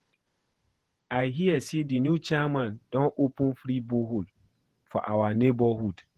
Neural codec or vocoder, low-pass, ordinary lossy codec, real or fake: vocoder, 48 kHz, 128 mel bands, Vocos; 14.4 kHz; Opus, 16 kbps; fake